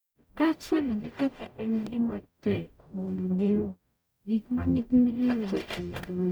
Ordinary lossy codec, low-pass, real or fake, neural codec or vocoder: none; none; fake; codec, 44.1 kHz, 0.9 kbps, DAC